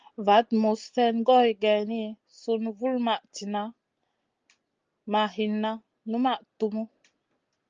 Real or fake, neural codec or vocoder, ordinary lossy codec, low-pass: real; none; Opus, 24 kbps; 7.2 kHz